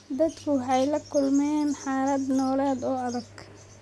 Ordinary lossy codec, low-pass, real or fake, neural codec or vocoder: none; none; real; none